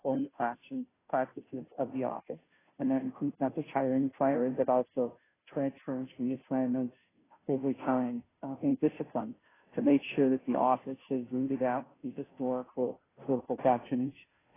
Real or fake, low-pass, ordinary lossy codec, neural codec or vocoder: fake; 3.6 kHz; AAC, 16 kbps; codec, 16 kHz, 0.5 kbps, FunCodec, trained on Chinese and English, 25 frames a second